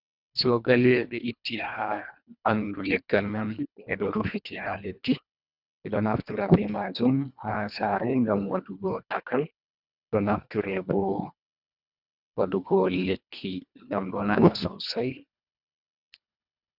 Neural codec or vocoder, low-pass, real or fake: codec, 24 kHz, 1.5 kbps, HILCodec; 5.4 kHz; fake